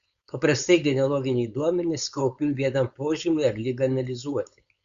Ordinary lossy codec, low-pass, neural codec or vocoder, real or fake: Opus, 64 kbps; 7.2 kHz; codec, 16 kHz, 4.8 kbps, FACodec; fake